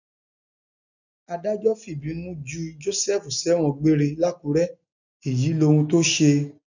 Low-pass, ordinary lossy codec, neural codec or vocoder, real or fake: 7.2 kHz; none; none; real